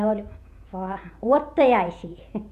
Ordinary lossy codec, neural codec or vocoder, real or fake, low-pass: none; vocoder, 48 kHz, 128 mel bands, Vocos; fake; 14.4 kHz